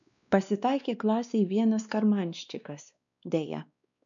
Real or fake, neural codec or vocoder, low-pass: fake; codec, 16 kHz, 2 kbps, X-Codec, WavLM features, trained on Multilingual LibriSpeech; 7.2 kHz